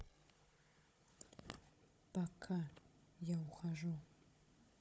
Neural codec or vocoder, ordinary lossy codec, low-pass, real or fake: codec, 16 kHz, 16 kbps, FunCodec, trained on Chinese and English, 50 frames a second; none; none; fake